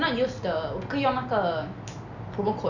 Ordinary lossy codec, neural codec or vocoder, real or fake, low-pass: none; none; real; 7.2 kHz